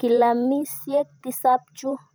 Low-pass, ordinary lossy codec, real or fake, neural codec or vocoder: none; none; fake; vocoder, 44.1 kHz, 128 mel bands every 512 samples, BigVGAN v2